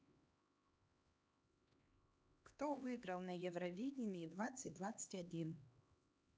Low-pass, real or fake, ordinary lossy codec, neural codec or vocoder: none; fake; none; codec, 16 kHz, 2 kbps, X-Codec, HuBERT features, trained on LibriSpeech